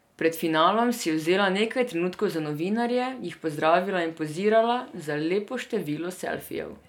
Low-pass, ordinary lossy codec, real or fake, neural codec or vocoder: 19.8 kHz; none; real; none